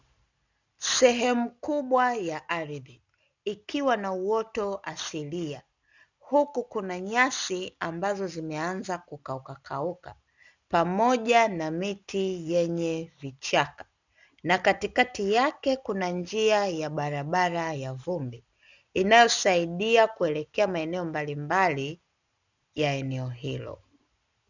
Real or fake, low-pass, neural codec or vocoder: real; 7.2 kHz; none